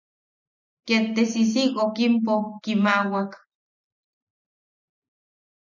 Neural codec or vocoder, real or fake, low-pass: none; real; 7.2 kHz